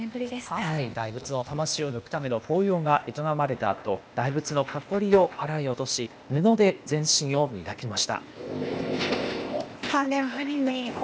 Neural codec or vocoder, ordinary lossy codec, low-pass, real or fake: codec, 16 kHz, 0.8 kbps, ZipCodec; none; none; fake